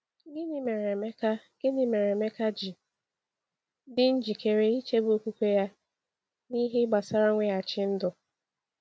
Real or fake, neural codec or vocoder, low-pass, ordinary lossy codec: real; none; none; none